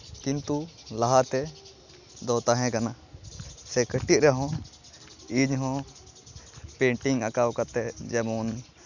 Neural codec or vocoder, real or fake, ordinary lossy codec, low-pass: none; real; none; 7.2 kHz